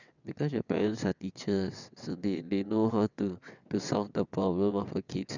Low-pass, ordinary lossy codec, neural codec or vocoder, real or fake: 7.2 kHz; none; vocoder, 22.05 kHz, 80 mel bands, WaveNeXt; fake